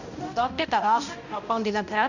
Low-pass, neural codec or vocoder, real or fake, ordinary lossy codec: 7.2 kHz; codec, 16 kHz, 0.5 kbps, X-Codec, HuBERT features, trained on balanced general audio; fake; none